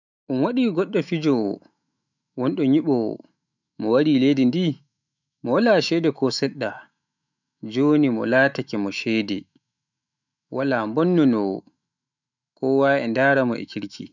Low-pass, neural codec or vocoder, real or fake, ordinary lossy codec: 7.2 kHz; autoencoder, 48 kHz, 128 numbers a frame, DAC-VAE, trained on Japanese speech; fake; none